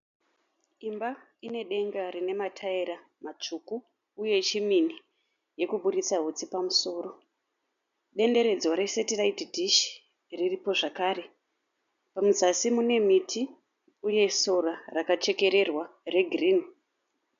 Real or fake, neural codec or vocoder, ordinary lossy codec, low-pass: real; none; MP3, 96 kbps; 7.2 kHz